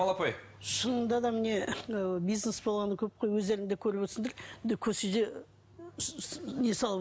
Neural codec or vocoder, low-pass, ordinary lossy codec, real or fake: none; none; none; real